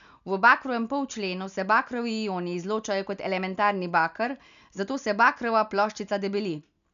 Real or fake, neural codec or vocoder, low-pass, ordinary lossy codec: real; none; 7.2 kHz; none